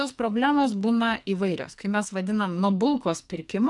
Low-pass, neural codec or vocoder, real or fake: 10.8 kHz; codec, 44.1 kHz, 2.6 kbps, SNAC; fake